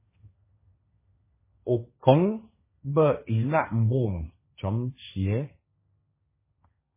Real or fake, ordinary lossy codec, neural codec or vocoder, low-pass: fake; MP3, 16 kbps; codec, 16 kHz, 1.1 kbps, Voila-Tokenizer; 3.6 kHz